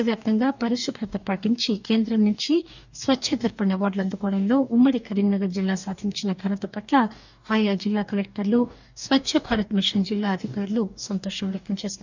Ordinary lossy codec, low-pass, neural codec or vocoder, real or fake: none; 7.2 kHz; codec, 44.1 kHz, 2.6 kbps, DAC; fake